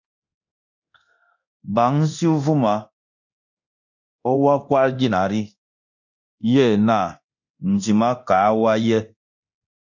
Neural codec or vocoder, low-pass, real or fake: codec, 24 kHz, 0.9 kbps, DualCodec; 7.2 kHz; fake